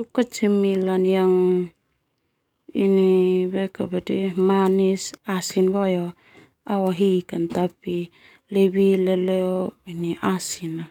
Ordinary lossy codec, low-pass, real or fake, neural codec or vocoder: none; 19.8 kHz; fake; codec, 44.1 kHz, 7.8 kbps, DAC